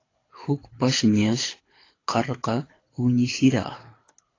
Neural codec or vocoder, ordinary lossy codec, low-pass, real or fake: codec, 24 kHz, 6 kbps, HILCodec; AAC, 32 kbps; 7.2 kHz; fake